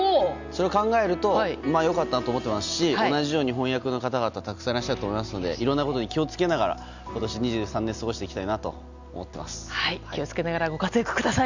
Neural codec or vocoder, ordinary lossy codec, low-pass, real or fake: none; none; 7.2 kHz; real